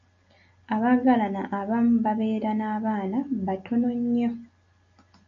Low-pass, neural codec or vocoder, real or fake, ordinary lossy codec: 7.2 kHz; none; real; AAC, 64 kbps